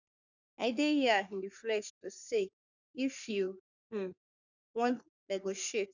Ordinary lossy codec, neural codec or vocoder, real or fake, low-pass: none; codec, 44.1 kHz, 3.4 kbps, Pupu-Codec; fake; 7.2 kHz